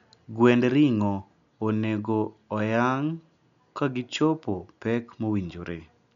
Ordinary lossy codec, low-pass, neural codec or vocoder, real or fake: none; 7.2 kHz; none; real